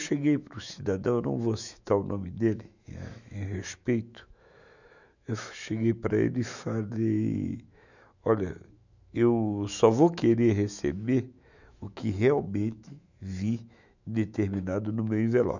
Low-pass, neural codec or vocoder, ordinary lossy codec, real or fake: 7.2 kHz; autoencoder, 48 kHz, 128 numbers a frame, DAC-VAE, trained on Japanese speech; none; fake